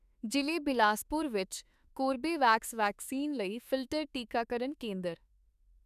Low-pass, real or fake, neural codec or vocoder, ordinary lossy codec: 14.4 kHz; fake; autoencoder, 48 kHz, 32 numbers a frame, DAC-VAE, trained on Japanese speech; none